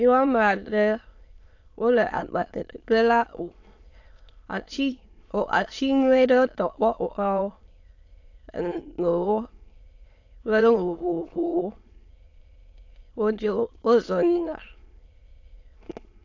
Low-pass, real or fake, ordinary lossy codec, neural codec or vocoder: 7.2 kHz; fake; AAC, 48 kbps; autoencoder, 22.05 kHz, a latent of 192 numbers a frame, VITS, trained on many speakers